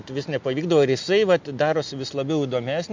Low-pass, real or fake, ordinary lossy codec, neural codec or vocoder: 7.2 kHz; real; MP3, 64 kbps; none